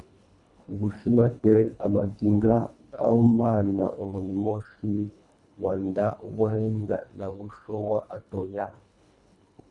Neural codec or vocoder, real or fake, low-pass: codec, 24 kHz, 1.5 kbps, HILCodec; fake; 10.8 kHz